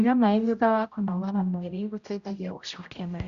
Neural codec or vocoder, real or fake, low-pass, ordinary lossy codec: codec, 16 kHz, 0.5 kbps, X-Codec, HuBERT features, trained on general audio; fake; 7.2 kHz; Opus, 64 kbps